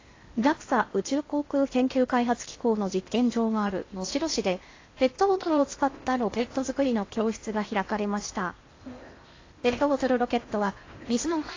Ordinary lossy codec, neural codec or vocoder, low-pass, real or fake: AAC, 32 kbps; codec, 16 kHz in and 24 kHz out, 0.8 kbps, FocalCodec, streaming, 65536 codes; 7.2 kHz; fake